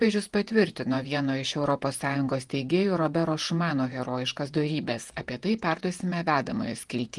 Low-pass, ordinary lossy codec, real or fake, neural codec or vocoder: 10.8 kHz; Opus, 24 kbps; fake; vocoder, 48 kHz, 128 mel bands, Vocos